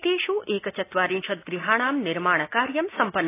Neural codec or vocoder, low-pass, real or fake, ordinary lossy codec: none; 3.6 kHz; real; AAC, 24 kbps